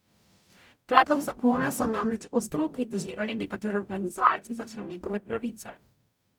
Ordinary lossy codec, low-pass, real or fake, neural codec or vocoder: none; 19.8 kHz; fake; codec, 44.1 kHz, 0.9 kbps, DAC